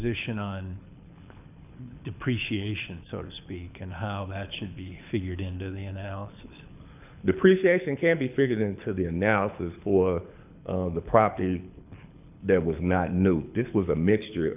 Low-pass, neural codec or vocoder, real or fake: 3.6 kHz; codec, 24 kHz, 6 kbps, HILCodec; fake